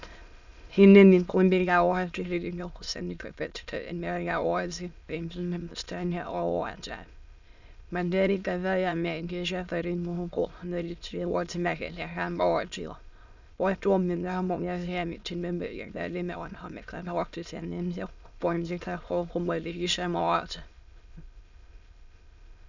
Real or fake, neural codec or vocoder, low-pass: fake; autoencoder, 22.05 kHz, a latent of 192 numbers a frame, VITS, trained on many speakers; 7.2 kHz